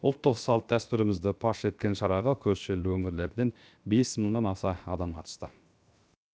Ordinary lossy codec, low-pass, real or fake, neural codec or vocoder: none; none; fake; codec, 16 kHz, 0.7 kbps, FocalCodec